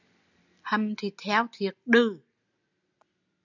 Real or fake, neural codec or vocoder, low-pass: real; none; 7.2 kHz